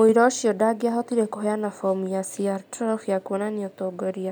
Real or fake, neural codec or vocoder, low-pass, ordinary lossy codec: real; none; none; none